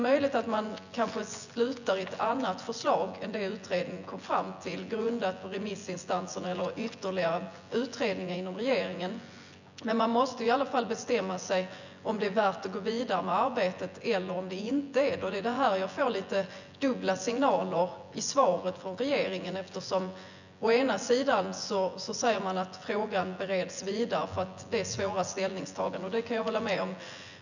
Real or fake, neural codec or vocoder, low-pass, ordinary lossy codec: fake; vocoder, 24 kHz, 100 mel bands, Vocos; 7.2 kHz; none